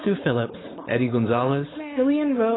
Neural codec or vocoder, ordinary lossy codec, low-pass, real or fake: codec, 16 kHz, 4.8 kbps, FACodec; AAC, 16 kbps; 7.2 kHz; fake